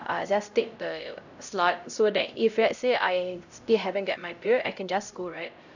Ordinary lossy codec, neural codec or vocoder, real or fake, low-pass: none; codec, 16 kHz, 0.5 kbps, X-Codec, HuBERT features, trained on LibriSpeech; fake; 7.2 kHz